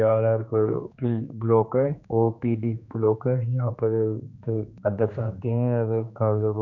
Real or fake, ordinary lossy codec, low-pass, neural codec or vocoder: fake; none; 7.2 kHz; codec, 16 kHz, 2 kbps, X-Codec, HuBERT features, trained on general audio